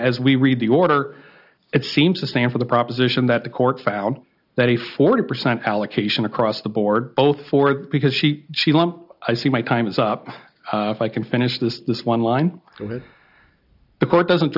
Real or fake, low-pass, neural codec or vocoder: real; 5.4 kHz; none